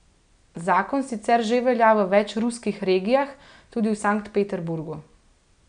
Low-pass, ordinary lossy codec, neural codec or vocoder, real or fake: 9.9 kHz; none; none; real